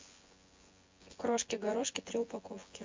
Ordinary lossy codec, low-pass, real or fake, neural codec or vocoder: MP3, 48 kbps; 7.2 kHz; fake; vocoder, 24 kHz, 100 mel bands, Vocos